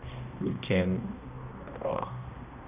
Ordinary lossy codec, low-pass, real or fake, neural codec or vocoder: none; 3.6 kHz; fake; codec, 16 kHz, 1 kbps, X-Codec, HuBERT features, trained on balanced general audio